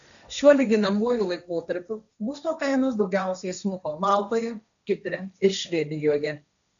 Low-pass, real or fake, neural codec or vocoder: 7.2 kHz; fake; codec, 16 kHz, 1.1 kbps, Voila-Tokenizer